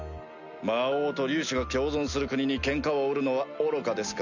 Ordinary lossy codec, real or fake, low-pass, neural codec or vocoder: none; real; 7.2 kHz; none